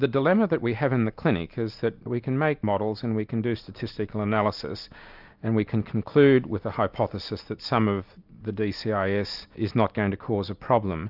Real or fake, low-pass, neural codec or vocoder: real; 5.4 kHz; none